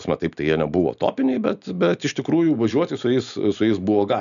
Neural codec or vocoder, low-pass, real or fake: none; 7.2 kHz; real